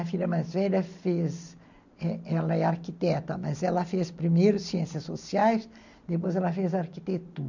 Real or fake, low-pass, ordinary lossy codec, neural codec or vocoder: real; 7.2 kHz; none; none